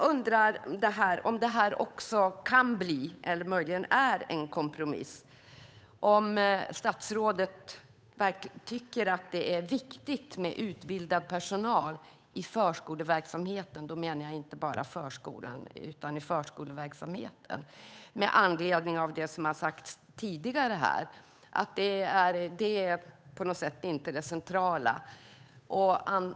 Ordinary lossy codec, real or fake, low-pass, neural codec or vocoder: none; fake; none; codec, 16 kHz, 8 kbps, FunCodec, trained on Chinese and English, 25 frames a second